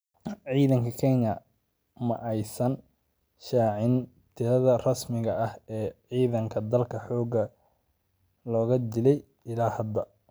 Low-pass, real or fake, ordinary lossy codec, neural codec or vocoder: none; real; none; none